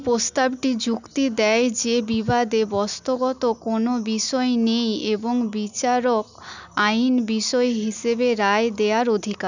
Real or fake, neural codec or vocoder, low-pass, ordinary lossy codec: real; none; 7.2 kHz; none